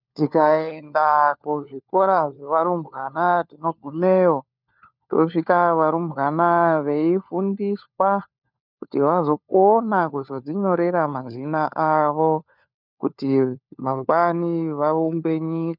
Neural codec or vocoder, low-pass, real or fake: codec, 16 kHz, 4 kbps, FunCodec, trained on LibriTTS, 50 frames a second; 5.4 kHz; fake